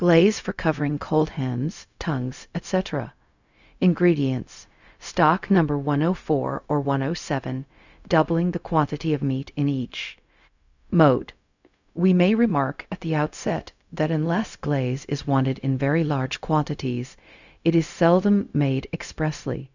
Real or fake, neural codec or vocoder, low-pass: fake; codec, 16 kHz, 0.4 kbps, LongCat-Audio-Codec; 7.2 kHz